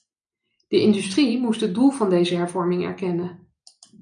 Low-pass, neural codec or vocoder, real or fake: 9.9 kHz; none; real